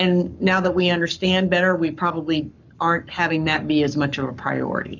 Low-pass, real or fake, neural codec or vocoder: 7.2 kHz; fake; codec, 44.1 kHz, 7.8 kbps, Pupu-Codec